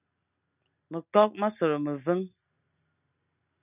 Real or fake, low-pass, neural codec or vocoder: real; 3.6 kHz; none